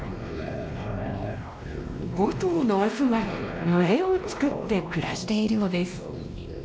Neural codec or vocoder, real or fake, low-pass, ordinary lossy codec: codec, 16 kHz, 1 kbps, X-Codec, WavLM features, trained on Multilingual LibriSpeech; fake; none; none